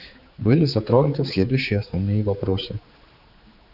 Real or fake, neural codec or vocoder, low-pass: fake; codec, 16 kHz, 4 kbps, X-Codec, HuBERT features, trained on general audio; 5.4 kHz